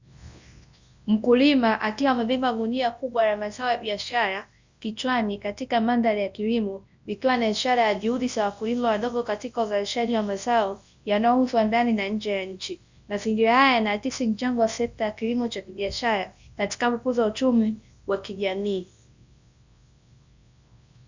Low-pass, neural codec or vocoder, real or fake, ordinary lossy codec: 7.2 kHz; codec, 24 kHz, 0.9 kbps, WavTokenizer, large speech release; fake; Opus, 64 kbps